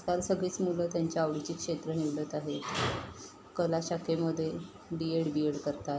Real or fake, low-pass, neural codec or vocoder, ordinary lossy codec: real; none; none; none